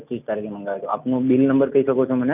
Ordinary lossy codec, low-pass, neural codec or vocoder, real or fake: none; 3.6 kHz; none; real